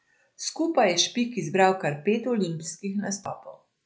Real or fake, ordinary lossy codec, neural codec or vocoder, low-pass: real; none; none; none